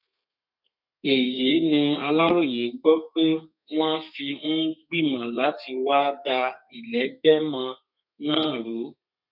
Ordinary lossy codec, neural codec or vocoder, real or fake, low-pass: none; codec, 32 kHz, 1.9 kbps, SNAC; fake; 5.4 kHz